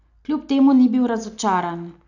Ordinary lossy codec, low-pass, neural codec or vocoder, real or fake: none; 7.2 kHz; none; real